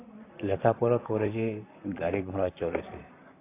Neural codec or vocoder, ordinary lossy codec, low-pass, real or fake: vocoder, 44.1 kHz, 128 mel bands, Pupu-Vocoder; AAC, 24 kbps; 3.6 kHz; fake